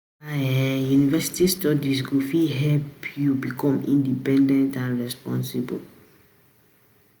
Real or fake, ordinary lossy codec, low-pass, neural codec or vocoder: real; none; none; none